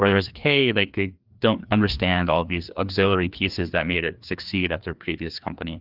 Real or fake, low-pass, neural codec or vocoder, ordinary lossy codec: fake; 5.4 kHz; codec, 16 kHz, 2 kbps, FreqCodec, larger model; Opus, 32 kbps